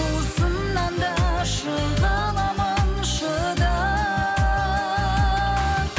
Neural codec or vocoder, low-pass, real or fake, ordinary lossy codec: none; none; real; none